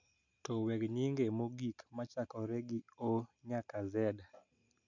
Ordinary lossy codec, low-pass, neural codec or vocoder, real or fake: none; 7.2 kHz; none; real